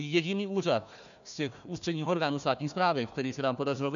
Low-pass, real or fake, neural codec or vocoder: 7.2 kHz; fake; codec, 16 kHz, 1 kbps, FunCodec, trained on Chinese and English, 50 frames a second